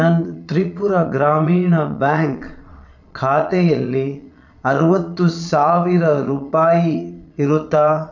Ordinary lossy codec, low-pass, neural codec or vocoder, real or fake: none; 7.2 kHz; vocoder, 22.05 kHz, 80 mel bands, Vocos; fake